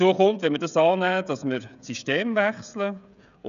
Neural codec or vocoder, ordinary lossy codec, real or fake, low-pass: codec, 16 kHz, 16 kbps, FreqCodec, smaller model; none; fake; 7.2 kHz